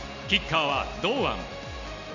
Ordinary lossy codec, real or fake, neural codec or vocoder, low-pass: none; real; none; 7.2 kHz